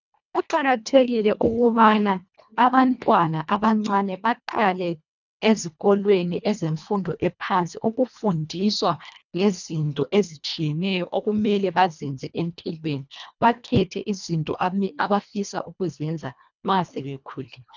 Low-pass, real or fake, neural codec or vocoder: 7.2 kHz; fake; codec, 24 kHz, 1.5 kbps, HILCodec